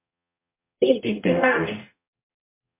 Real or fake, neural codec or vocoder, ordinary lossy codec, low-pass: fake; codec, 44.1 kHz, 0.9 kbps, DAC; MP3, 32 kbps; 3.6 kHz